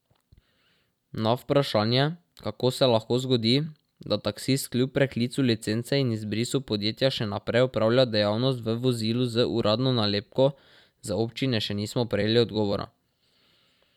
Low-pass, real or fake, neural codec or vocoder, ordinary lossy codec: 19.8 kHz; fake; vocoder, 44.1 kHz, 128 mel bands every 256 samples, BigVGAN v2; none